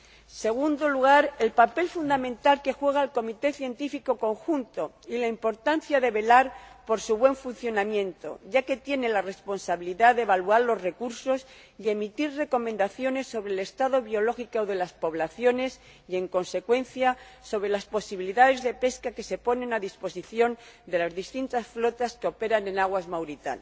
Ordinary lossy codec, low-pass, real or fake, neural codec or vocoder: none; none; real; none